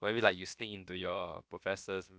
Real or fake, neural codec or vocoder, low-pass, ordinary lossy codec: fake; codec, 16 kHz, about 1 kbps, DyCAST, with the encoder's durations; none; none